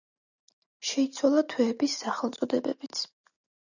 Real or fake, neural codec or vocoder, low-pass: real; none; 7.2 kHz